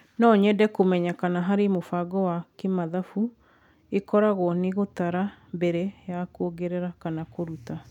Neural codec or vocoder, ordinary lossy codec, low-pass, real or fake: none; none; 19.8 kHz; real